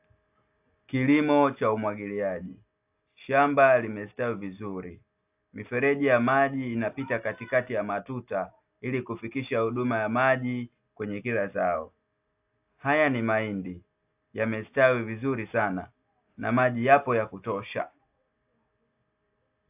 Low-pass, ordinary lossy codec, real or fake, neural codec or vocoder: 3.6 kHz; AAC, 32 kbps; real; none